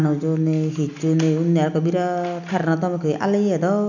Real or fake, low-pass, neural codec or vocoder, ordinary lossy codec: real; 7.2 kHz; none; none